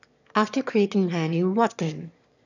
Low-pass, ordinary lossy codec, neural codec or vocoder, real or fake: 7.2 kHz; none; autoencoder, 22.05 kHz, a latent of 192 numbers a frame, VITS, trained on one speaker; fake